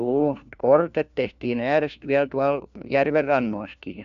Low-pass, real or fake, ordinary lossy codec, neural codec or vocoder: 7.2 kHz; fake; none; codec, 16 kHz, 1 kbps, FunCodec, trained on LibriTTS, 50 frames a second